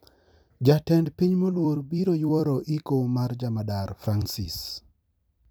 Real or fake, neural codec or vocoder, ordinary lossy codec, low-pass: fake; vocoder, 44.1 kHz, 128 mel bands every 256 samples, BigVGAN v2; none; none